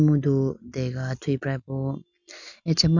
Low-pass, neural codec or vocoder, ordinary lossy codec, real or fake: 7.2 kHz; none; none; real